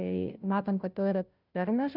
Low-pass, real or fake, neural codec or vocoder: 5.4 kHz; fake; codec, 16 kHz, 0.5 kbps, FunCodec, trained on Chinese and English, 25 frames a second